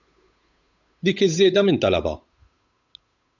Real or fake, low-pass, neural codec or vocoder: fake; 7.2 kHz; codec, 16 kHz, 8 kbps, FunCodec, trained on Chinese and English, 25 frames a second